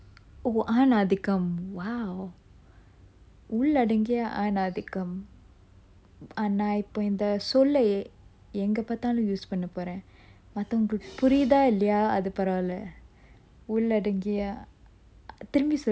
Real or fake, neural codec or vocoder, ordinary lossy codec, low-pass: real; none; none; none